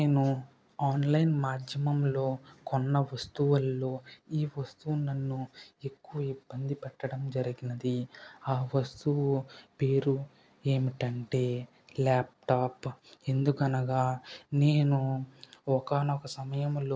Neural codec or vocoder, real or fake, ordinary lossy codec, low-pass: none; real; none; none